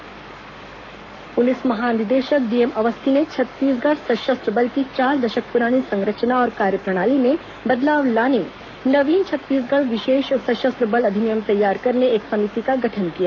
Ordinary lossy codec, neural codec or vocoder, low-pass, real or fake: none; codec, 44.1 kHz, 7.8 kbps, Pupu-Codec; 7.2 kHz; fake